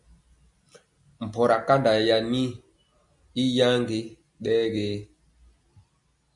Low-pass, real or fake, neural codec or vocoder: 10.8 kHz; real; none